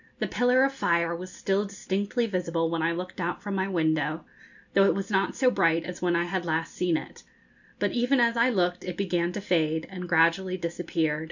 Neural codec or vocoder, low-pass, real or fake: none; 7.2 kHz; real